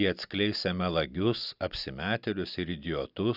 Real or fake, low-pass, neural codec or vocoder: real; 5.4 kHz; none